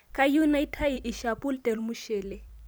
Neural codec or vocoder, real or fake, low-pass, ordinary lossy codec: vocoder, 44.1 kHz, 128 mel bands every 256 samples, BigVGAN v2; fake; none; none